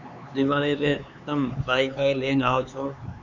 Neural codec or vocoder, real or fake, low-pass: codec, 16 kHz, 4 kbps, X-Codec, HuBERT features, trained on LibriSpeech; fake; 7.2 kHz